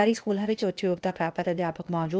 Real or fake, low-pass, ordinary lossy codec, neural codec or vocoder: fake; none; none; codec, 16 kHz, 0.8 kbps, ZipCodec